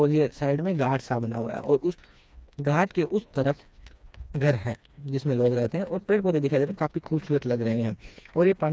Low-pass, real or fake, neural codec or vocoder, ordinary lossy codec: none; fake; codec, 16 kHz, 2 kbps, FreqCodec, smaller model; none